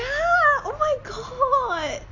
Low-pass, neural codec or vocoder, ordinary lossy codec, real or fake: 7.2 kHz; none; AAC, 32 kbps; real